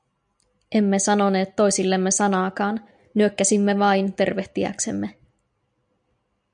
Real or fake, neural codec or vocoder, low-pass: real; none; 9.9 kHz